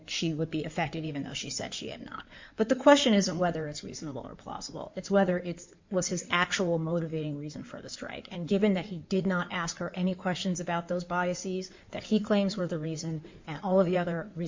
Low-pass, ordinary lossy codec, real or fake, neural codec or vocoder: 7.2 kHz; MP3, 64 kbps; fake; codec, 16 kHz in and 24 kHz out, 2.2 kbps, FireRedTTS-2 codec